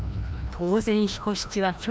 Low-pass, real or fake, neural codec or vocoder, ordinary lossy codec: none; fake; codec, 16 kHz, 1 kbps, FreqCodec, larger model; none